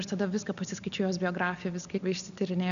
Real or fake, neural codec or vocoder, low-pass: real; none; 7.2 kHz